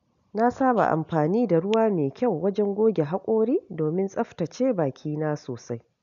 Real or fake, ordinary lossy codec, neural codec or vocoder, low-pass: real; none; none; 7.2 kHz